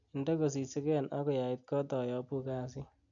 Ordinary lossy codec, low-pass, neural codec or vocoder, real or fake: none; 7.2 kHz; none; real